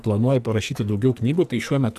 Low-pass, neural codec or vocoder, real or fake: 14.4 kHz; codec, 44.1 kHz, 2.6 kbps, SNAC; fake